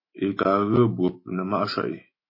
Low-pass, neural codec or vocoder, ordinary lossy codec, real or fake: 5.4 kHz; autoencoder, 48 kHz, 128 numbers a frame, DAC-VAE, trained on Japanese speech; MP3, 24 kbps; fake